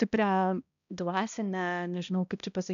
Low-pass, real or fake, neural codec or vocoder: 7.2 kHz; fake; codec, 16 kHz, 1 kbps, X-Codec, HuBERT features, trained on balanced general audio